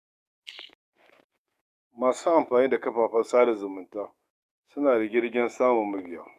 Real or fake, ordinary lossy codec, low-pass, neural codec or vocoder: fake; none; 14.4 kHz; codec, 44.1 kHz, 7.8 kbps, DAC